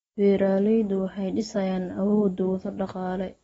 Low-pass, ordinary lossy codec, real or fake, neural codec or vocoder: 19.8 kHz; AAC, 24 kbps; real; none